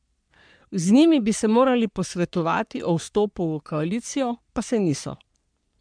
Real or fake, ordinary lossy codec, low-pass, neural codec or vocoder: fake; MP3, 96 kbps; 9.9 kHz; codec, 44.1 kHz, 3.4 kbps, Pupu-Codec